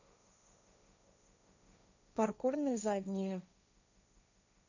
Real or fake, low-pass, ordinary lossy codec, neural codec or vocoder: fake; 7.2 kHz; none; codec, 16 kHz, 1.1 kbps, Voila-Tokenizer